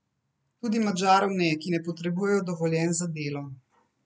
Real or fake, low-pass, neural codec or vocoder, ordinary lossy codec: real; none; none; none